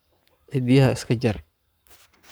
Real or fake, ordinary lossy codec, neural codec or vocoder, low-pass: fake; none; codec, 44.1 kHz, 7.8 kbps, Pupu-Codec; none